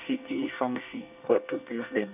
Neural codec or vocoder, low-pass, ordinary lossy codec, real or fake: codec, 24 kHz, 1 kbps, SNAC; 3.6 kHz; none; fake